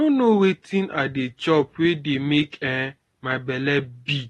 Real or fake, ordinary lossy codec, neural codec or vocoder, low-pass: real; AAC, 32 kbps; none; 19.8 kHz